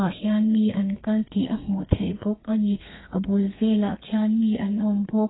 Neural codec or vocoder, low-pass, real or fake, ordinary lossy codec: codec, 32 kHz, 1.9 kbps, SNAC; 7.2 kHz; fake; AAC, 16 kbps